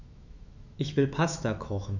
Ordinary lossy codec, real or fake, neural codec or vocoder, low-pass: none; fake; autoencoder, 48 kHz, 128 numbers a frame, DAC-VAE, trained on Japanese speech; 7.2 kHz